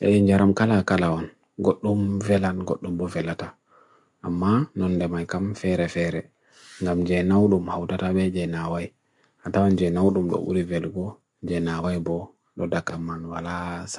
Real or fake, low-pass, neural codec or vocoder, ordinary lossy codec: real; 10.8 kHz; none; MP3, 64 kbps